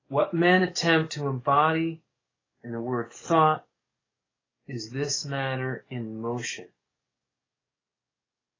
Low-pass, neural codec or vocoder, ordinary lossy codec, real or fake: 7.2 kHz; codec, 44.1 kHz, 7.8 kbps, DAC; AAC, 32 kbps; fake